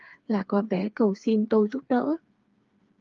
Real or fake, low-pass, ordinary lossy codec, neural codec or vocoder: fake; 7.2 kHz; Opus, 32 kbps; codec, 16 kHz, 4 kbps, FreqCodec, smaller model